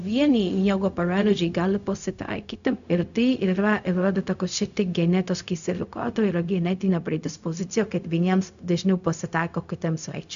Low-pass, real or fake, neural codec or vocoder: 7.2 kHz; fake; codec, 16 kHz, 0.4 kbps, LongCat-Audio-Codec